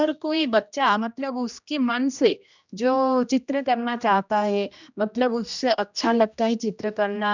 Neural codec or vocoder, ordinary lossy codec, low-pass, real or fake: codec, 16 kHz, 1 kbps, X-Codec, HuBERT features, trained on general audio; none; 7.2 kHz; fake